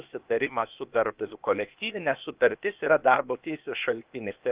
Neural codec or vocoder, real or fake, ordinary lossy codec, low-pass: codec, 16 kHz, 0.8 kbps, ZipCodec; fake; Opus, 32 kbps; 3.6 kHz